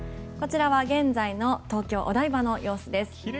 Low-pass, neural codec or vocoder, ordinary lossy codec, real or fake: none; none; none; real